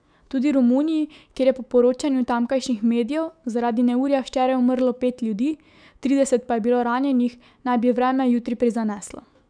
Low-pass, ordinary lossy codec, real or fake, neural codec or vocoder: 9.9 kHz; none; fake; autoencoder, 48 kHz, 128 numbers a frame, DAC-VAE, trained on Japanese speech